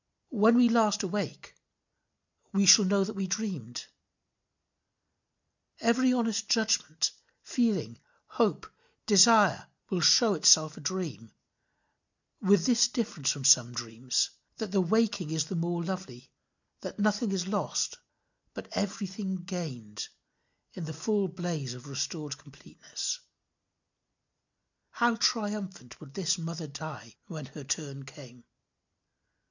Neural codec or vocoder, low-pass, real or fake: none; 7.2 kHz; real